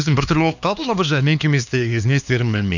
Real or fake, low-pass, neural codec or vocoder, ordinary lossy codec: fake; 7.2 kHz; codec, 16 kHz, 2 kbps, X-Codec, HuBERT features, trained on LibriSpeech; none